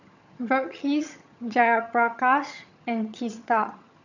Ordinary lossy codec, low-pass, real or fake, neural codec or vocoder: none; 7.2 kHz; fake; vocoder, 22.05 kHz, 80 mel bands, HiFi-GAN